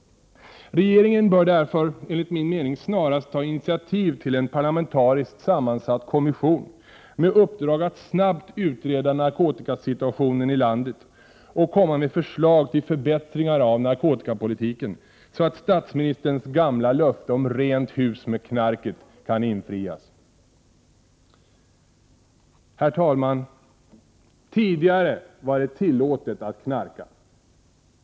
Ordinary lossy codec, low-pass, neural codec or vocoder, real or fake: none; none; none; real